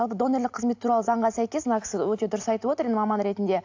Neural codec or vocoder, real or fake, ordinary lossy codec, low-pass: none; real; AAC, 48 kbps; 7.2 kHz